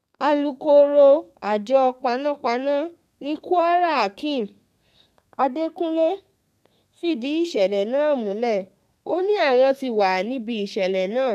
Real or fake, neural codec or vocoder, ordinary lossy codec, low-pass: fake; codec, 32 kHz, 1.9 kbps, SNAC; none; 14.4 kHz